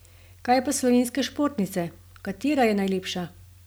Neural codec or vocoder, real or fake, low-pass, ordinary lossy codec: none; real; none; none